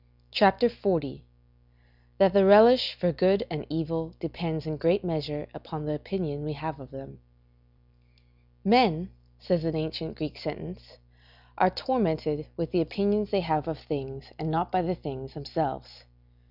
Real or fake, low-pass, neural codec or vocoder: real; 5.4 kHz; none